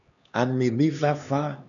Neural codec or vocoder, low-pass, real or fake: codec, 16 kHz, 2 kbps, X-Codec, HuBERT features, trained on LibriSpeech; 7.2 kHz; fake